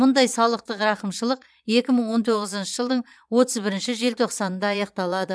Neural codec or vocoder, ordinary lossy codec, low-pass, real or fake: vocoder, 22.05 kHz, 80 mel bands, Vocos; none; none; fake